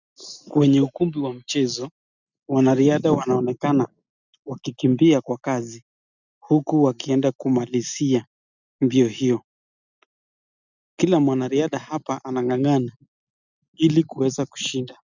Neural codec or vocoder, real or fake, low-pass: none; real; 7.2 kHz